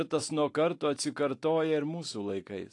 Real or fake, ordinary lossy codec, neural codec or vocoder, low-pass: real; AAC, 48 kbps; none; 10.8 kHz